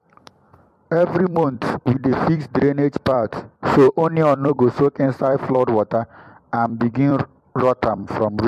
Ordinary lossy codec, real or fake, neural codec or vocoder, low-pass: MP3, 64 kbps; fake; vocoder, 44.1 kHz, 128 mel bands every 256 samples, BigVGAN v2; 14.4 kHz